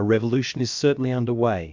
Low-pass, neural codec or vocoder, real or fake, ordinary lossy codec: 7.2 kHz; codec, 16 kHz, about 1 kbps, DyCAST, with the encoder's durations; fake; MP3, 64 kbps